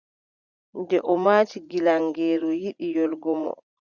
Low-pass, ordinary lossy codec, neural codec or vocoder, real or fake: 7.2 kHz; Opus, 64 kbps; vocoder, 44.1 kHz, 80 mel bands, Vocos; fake